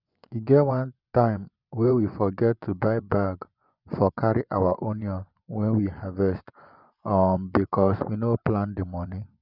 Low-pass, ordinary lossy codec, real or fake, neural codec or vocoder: 5.4 kHz; none; fake; vocoder, 24 kHz, 100 mel bands, Vocos